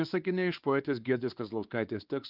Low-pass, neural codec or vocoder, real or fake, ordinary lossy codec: 5.4 kHz; codec, 16 kHz, 2 kbps, X-Codec, WavLM features, trained on Multilingual LibriSpeech; fake; Opus, 32 kbps